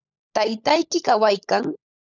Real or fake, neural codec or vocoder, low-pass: fake; codec, 16 kHz, 16 kbps, FunCodec, trained on LibriTTS, 50 frames a second; 7.2 kHz